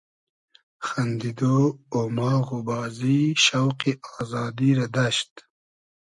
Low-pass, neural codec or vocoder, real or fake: 10.8 kHz; none; real